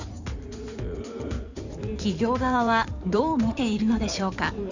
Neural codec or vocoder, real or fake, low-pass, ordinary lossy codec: codec, 16 kHz in and 24 kHz out, 2.2 kbps, FireRedTTS-2 codec; fake; 7.2 kHz; none